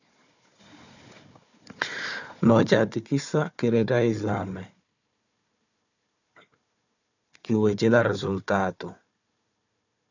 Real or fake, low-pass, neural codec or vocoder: fake; 7.2 kHz; codec, 16 kHz, 4 kbps, FunCodec, trained on Chinese and English, 50 frames a second